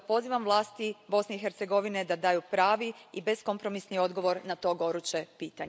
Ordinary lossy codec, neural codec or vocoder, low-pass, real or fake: none; none; none; real